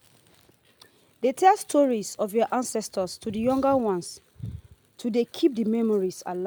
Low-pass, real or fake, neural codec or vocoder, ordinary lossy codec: none; real; none; none